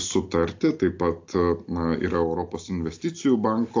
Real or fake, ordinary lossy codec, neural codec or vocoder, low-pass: real; AAC, 48 kbps; none; 7.2 kHz